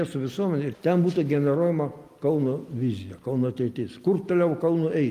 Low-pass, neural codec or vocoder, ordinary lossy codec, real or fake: 14.4 kHz; none; Opus, 32 kbps; real